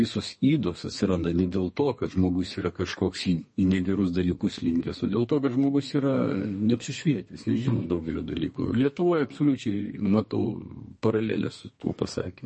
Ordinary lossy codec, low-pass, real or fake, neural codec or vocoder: MP3, 32 kbps; 10.8 kHz; fake; codec, 32 kHz, 1.9 kbps, SNAC